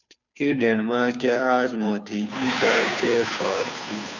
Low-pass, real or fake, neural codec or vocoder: 7.2 kHz; fake; codec, 16 kHz, 2 kbps, FunCodec, trained on Chinese and English, 25 frames a second